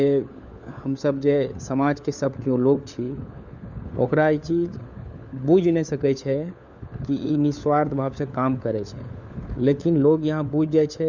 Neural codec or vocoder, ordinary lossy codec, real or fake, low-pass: codec, 16 kHz, 4 kbps, FunCodec, trained on LibriTTS, 50 frames a second; none; fake; 7.2 kHz